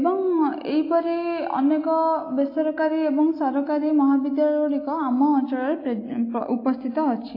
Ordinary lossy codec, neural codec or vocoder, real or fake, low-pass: AAC, 32 kbps; none; real; 5.4 kHz